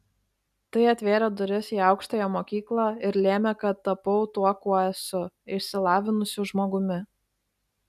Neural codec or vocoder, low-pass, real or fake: none; 14.4 kHz; real